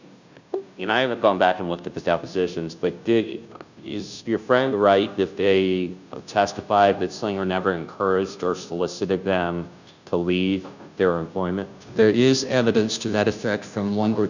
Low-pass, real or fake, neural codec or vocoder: 7.2 kHz; fake; codec, 16 kHz, 0.5 kbps, FunCodec, trained on Chinese and English, 25 frames a second